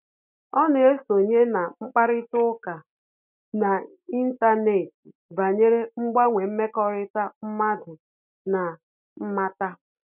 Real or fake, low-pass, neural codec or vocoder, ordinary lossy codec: real; 3.6 kHz; none; none